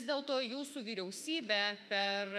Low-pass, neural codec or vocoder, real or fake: 14.4 kHz; autoencoder, 48 kHz, 32 numbers a frame, DAC-VAE, trained on Japanese speech; fake